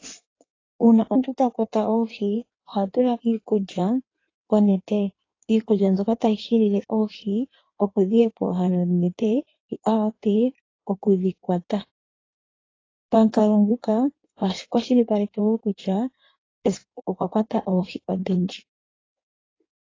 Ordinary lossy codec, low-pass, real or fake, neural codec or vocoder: AAC, 32 kbps; 7.2 kHz; fake; codec, 16 kHz in and 24 kHz out, 1.1 kbps, FireRedTTS-2 codec